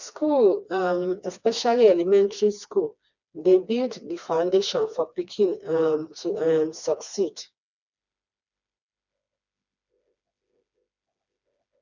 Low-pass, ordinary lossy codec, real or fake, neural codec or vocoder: 7.2 kHz; none; fake; codec, 16 kHz, 2 kbps, FreqCodec, smaller model